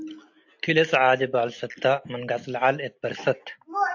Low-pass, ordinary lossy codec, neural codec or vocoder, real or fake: 7.2 kHz; AAC, 48 kbps; none; real